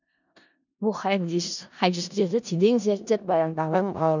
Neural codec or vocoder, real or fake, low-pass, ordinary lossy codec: codec, 16 kHz in and 24 kHz out, 0.4 kbps, LongCat-Audio-Codec, four codebook decoder; fake; 7.2 kHz; none